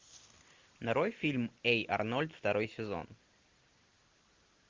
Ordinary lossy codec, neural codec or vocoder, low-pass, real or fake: Opus, 32 kbps; none; 7.2 kHz; real